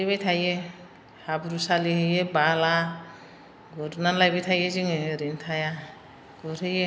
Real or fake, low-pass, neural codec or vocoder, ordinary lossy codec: real; none; none; none